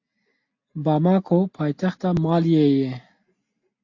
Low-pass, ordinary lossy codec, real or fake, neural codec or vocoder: 7.2 kHz; AAC, 48 kbps; real; none